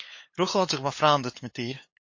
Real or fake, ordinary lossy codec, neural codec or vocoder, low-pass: fake; MP3, 32 kbps; codec, 16 kHz, 8 kbps, FunCodec, trained on LibriTTS, 25 frames a second; 7.2 kHz